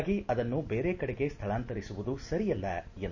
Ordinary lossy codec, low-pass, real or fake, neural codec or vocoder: none; 7.2 kHz; real; none